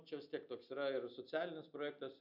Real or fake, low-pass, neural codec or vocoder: real; 5.4 kHz; none